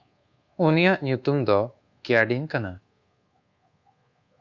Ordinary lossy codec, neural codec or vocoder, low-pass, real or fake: Opus, 64 kbps; codec, 24 kHz, 1.2 kbps, DualCodec; 7.2 kHz; fake